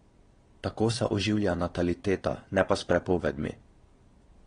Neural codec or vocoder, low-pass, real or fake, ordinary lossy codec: vocoder, 22.05 kHz, 80 mel bands, Vocos; 9.9 kHz; fake; AAC, 32 kbps